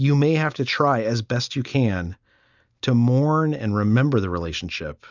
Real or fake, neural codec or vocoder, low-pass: real; none; 7.2 kHz